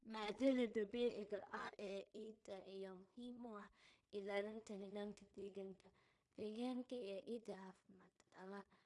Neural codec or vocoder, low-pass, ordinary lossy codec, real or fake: codec, 16 kHz in and 24 kHz out, 0.4 kbps, LongCat-Audio-Codec, two codebook decoder; 10.8 kHz; AAC, 64 kbps; fake